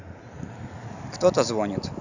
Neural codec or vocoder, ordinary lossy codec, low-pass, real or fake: none; none; 7.2 kHz; real